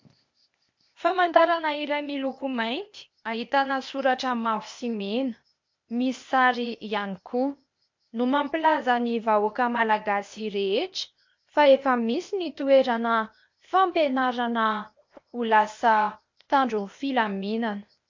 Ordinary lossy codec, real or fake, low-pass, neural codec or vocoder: MP3, 48 kbps; fake; 7.2 kHz; codec, 16 kHz, 0.8 kbps, ZipCodec